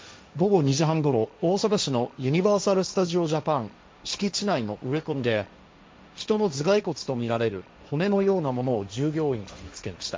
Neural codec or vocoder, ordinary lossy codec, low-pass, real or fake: codec, 16 kHz, 1.1 kbps, Voila-Tokenizer; none; none; fake